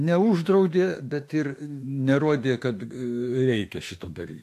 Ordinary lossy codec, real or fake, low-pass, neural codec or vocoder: AAC, 64 kbps; fake; 14.4 kHz; autoencoder, 48 kHz, 32 numbers a frame, DAC-VAE, trained on Japanese speech